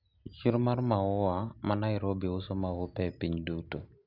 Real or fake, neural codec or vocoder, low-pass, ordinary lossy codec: real; none; 5.4 kHz; Opus, 64 kbps